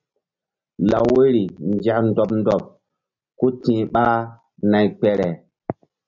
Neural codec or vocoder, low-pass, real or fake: none; 7.2 kHz; real